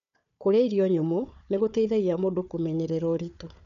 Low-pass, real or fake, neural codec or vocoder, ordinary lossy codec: 7.2 kHz; fake; codec, 16 kHz, 4 kbps, FunCodec, trained on Chinese and English, 50 frames a second; none